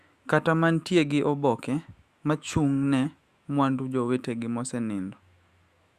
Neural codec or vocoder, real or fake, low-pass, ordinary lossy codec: autoencoder, 48 kHz, 128 numbers a frame, DAC-VAE, trained on Japanese speech; fake; 14.4 kHz; Opus, 64 kbps